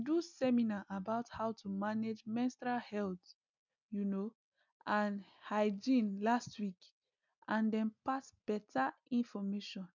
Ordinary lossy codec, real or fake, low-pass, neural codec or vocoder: none; real; 7.2 kHz; none